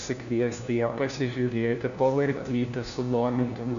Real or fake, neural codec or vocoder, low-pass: fake; codec, 16 kHz, 1 kbps, FunCodec, trained on LibriTTS, 50 frames a second; 7.2 kHz